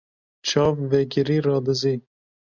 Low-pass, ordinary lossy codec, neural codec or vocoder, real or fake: 7.2 kHz; MP3, 64 kbps; none; real